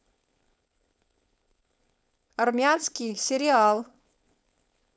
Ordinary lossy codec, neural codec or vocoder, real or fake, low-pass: none; codec, 16 kHz, 4.8 kbps, FACodec; fake; none